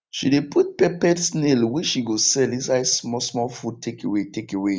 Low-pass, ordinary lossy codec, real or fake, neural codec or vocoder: none; none; real; none